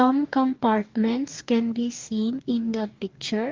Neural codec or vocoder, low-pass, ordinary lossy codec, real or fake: codec, 44.1 kHz, 2.6 kbps, DAC; 7.2 kHz; Opus, 24 kbps; fake